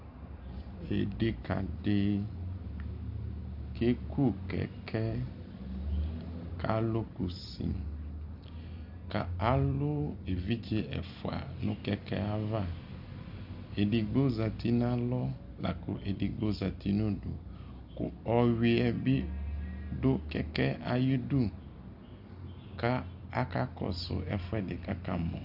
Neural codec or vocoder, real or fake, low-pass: none; real; 5.4 kHz